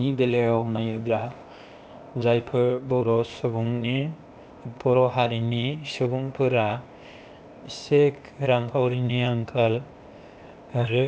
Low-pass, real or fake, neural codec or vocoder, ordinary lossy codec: none; fake; codec, 16 kHz, 0.8 kbps, ZipCodec; none